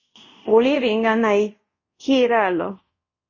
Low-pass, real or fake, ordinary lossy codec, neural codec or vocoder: 7.2 kHz; fake; MP3, 32 kbps; codec, 24 kHz, 0.5 kbps, DualCodec